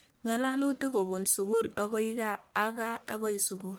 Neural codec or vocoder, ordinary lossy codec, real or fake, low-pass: codec, 44.1 kHz, 1.7 kbps, Pupu-Codec; none; fake; none